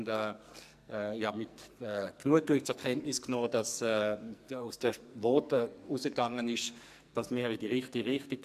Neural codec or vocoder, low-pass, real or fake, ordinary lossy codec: codec, 44.1 kHz, 2.6 kbps, SNAC; 14.4 kHz; fake; MP3, 96 kbps